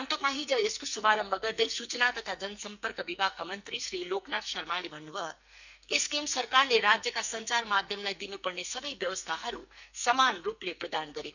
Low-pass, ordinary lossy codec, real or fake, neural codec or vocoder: 7.2 kHz; none; fake; codec, 44.1 kHz, 2.6 kbps, SNAC